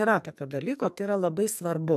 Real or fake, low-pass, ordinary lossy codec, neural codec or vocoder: fake; 14.4 kHz; AAC, 96 kbps; codec, 44.1 kHz, 2.6 kbps, SNAC